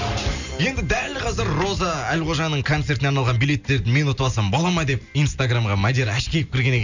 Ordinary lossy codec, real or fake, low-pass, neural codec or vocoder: none; real; 7.2 kHz; none